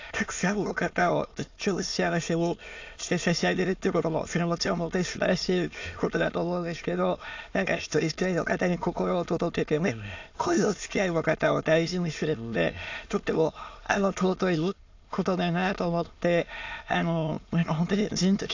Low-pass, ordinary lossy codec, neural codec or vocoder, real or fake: 7.2 kHz; AAC, 48 kbps; autoencoder, 22.05 kHz, a latent of 192 numbers a frame, VITS, trained on many speakers; fake